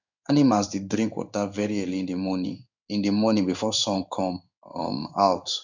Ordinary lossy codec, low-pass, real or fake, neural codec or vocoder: none; 7.2 kHz; fake; codec, 16 kHz in and 24 kHz out, 1 kbps, XY-Tokenizer